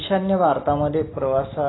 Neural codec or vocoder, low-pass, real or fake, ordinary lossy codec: none; 7.2 kHz; real; AAC, 16 kbps